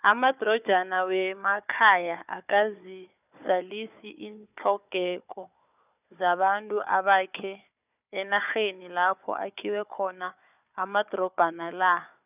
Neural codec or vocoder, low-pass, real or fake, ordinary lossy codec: codec, 16 kHz, 4 kbps, FunCodec, trained on Chinese and English, 50 frames a second; 3.6 kHz; fake; none